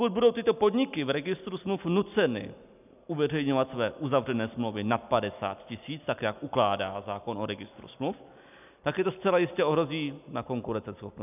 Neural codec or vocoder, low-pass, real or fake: none; 3.6 kHz; real